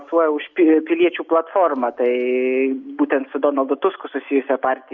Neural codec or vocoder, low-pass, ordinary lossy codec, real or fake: none; 7.2 kHz; Opus, 64 kbps; real